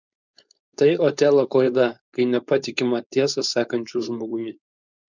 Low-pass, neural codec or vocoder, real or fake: 7.2 kHz; codec, 16 kHz, 4.8 kbps, FACodec; fake